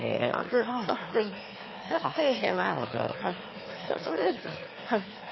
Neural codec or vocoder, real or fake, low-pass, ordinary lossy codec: autoencoder, 22.05 kHz, a latent of 192 numbers a frame, VITS, trained on one speaker; fake; 7.2 kHz; MP3, 24 kbps